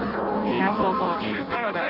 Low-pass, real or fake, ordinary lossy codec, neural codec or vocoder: 5.4 kHz; fake; none; codec, 16 kHz in and 24 kHz out, 0.6 kbps, FireRedTTS-2 codec